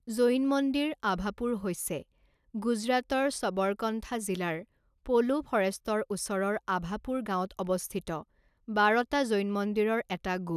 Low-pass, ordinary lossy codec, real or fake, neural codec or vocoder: 14.4 kHz; none; real; none